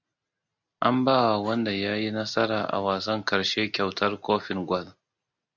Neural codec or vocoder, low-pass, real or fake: none; 7.2 kHz; real